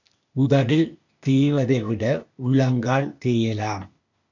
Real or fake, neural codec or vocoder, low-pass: fake; codec, 16 kHz, 0.8 kbps, ZipCodec; 7.2 kHz